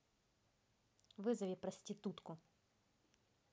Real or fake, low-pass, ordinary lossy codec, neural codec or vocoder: real; none; none; none